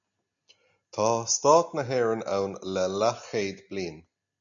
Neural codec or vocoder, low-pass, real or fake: none; 7.2 kHz; real